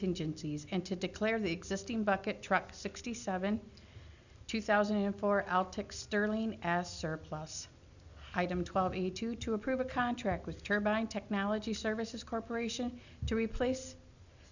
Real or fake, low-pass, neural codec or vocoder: real; 7.2 kHz; none